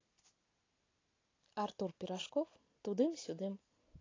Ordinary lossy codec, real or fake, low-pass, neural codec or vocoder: AAC, 32 kbps; real; 7.2 kHz; none